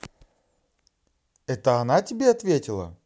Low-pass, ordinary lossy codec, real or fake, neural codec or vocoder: none; none; real; none